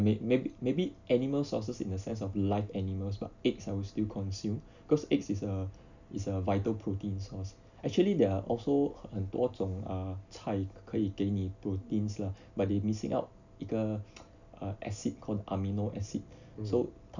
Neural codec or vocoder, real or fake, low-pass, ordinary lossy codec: none; real; 7.2 kHz; none